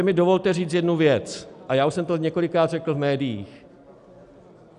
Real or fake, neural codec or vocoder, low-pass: real; none; 10.8 kHz